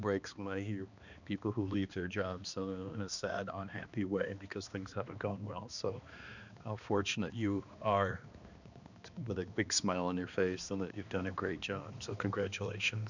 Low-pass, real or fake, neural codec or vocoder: 7.2 kHz; fake; codec, 16 kHz, 2 kbps, X-Codec, HuBERT features, trained on balanced general audio